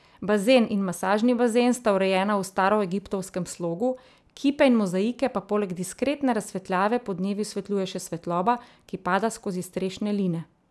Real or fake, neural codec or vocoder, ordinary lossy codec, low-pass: real; none; none; none